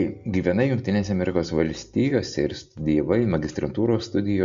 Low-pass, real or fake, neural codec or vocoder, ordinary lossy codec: 7.2 kHz; real; none; MP3, 64 kbps